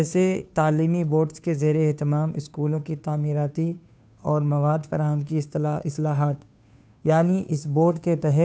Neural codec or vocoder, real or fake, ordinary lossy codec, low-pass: codec, 16 kHz, 2 kbps, FunCodec, trained on Chinese and English, 25 frames a second; fake; none; none